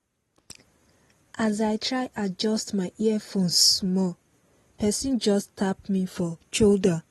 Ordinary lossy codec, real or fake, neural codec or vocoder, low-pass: AAC, 32 kbps; real; none; 19.8 kHz